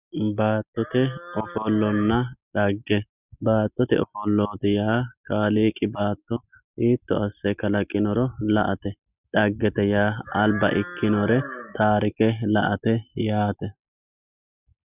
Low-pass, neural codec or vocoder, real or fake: 3.6 kHz; none; real